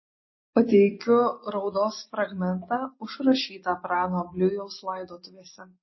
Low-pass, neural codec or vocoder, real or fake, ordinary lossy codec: 7.2 kHz; none; real; MP3, 24 kbps